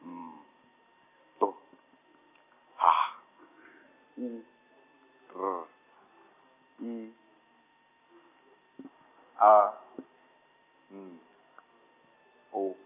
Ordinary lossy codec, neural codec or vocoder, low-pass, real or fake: none; none; 3.6 kHz; real